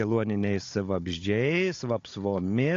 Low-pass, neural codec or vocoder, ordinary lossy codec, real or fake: 7.2 kHz; codec, 16 kHz, 16 kbps, FunCodec, trained on Chinese and English, 50 frames a second; AAC, 48 kbps; fake